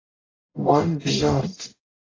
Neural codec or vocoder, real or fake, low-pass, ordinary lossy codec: codec, 44.1 kHz, 0.9 kbps, DAC; fake; 7.2 kHz; AAC, 32 kbps